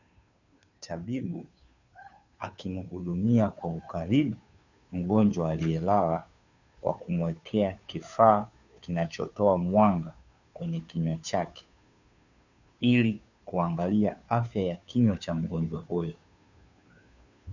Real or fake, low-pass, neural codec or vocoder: fake; 7.2 kHz; codec, 16 kHz, 2 kbps, FunCodec, trained on Chinese and English, 25 frames a second